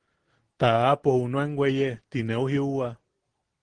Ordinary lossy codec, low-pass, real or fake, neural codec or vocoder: Opus, 16 kbps; 9.9 kHz; real; none